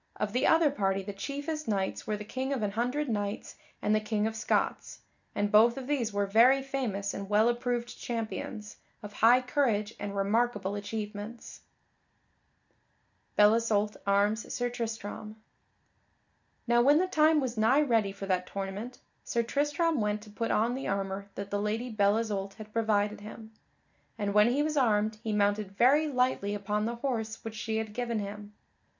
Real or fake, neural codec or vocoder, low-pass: real; none; 7.2 kHz